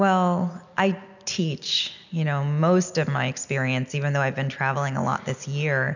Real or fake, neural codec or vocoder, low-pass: real; none; 7.2 kHz